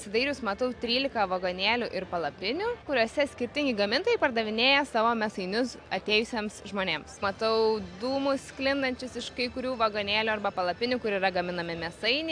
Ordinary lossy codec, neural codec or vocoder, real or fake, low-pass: AAC, 64 kbps; none; real; 9.9 kHz